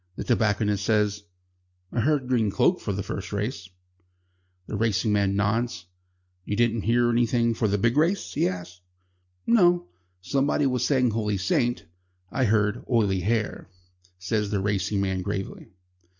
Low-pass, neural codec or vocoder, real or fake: 7.2 kHz; none; real